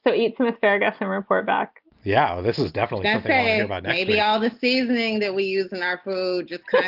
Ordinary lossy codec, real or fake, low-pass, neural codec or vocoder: Opus, 24 kbps; real; 5.4 kHz; none